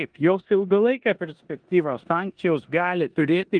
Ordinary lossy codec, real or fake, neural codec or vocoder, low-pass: Opus, 24 kbps; fake; codec, 16 kHz in and 24 kHz out, 0.9 kbps, LongCat-Audio-Codec, four codebook decoder; 9.9 kHz